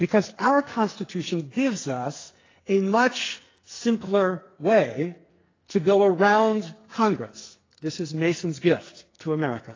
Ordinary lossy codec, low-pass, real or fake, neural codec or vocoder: AAC, 32 kbps; 7.2 kHz; fake; codec, 44.1 kHz, 2.6 kbps, SNAC